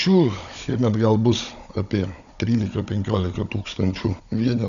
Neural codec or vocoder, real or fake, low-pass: codec, 16 kHz, 16 kbps, FunCodec, trained on Chinese and English, 50 frames a second; fake; 7.2 kHz